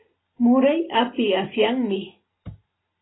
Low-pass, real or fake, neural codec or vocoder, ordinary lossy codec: 7.2 kHz; real; none; AAC, 16 kbps